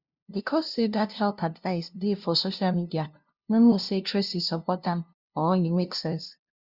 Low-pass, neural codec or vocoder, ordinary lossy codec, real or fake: 5.4 kHz; codec, 16 kHz, 0.5 kbps, FunCodec, trained on LibriTTS, 25 frames a second; Opus, 64 kbps; fake